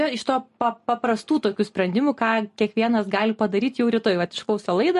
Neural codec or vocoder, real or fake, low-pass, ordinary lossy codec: none; real; 14.4 kHz; MP3, 48 kbps